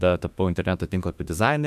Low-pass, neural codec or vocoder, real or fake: 14.4 kHz; autoencoder, 48 kHz, 32 numbers a frame, DAC-VAE, trained on Japanese speech; fake